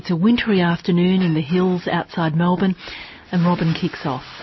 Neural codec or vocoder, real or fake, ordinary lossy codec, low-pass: none; real; MP3, 24 kbps; 7.2 kHz